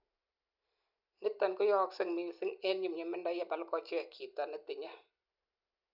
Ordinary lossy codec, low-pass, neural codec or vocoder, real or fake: none; 5.4 kHz; none; real